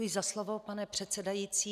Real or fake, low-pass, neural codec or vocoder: real; 14.4 kHz; none